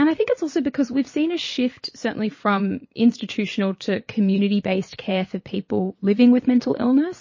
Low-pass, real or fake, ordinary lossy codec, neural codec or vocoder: 7.2 kHz; fake; MP3, 32 kbps; vocoder, 22.05 kHz, 80 mel bands, Vocos